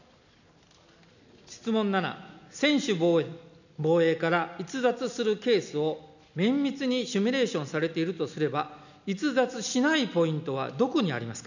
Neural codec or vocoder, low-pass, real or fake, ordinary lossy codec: none; 7.2 kHz; real; none